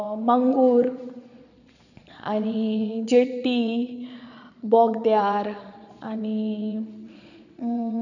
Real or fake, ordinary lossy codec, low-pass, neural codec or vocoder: fake; none; 7.2 kHz; vocoder, 22.05 kHz, 80 mel bands, Vocos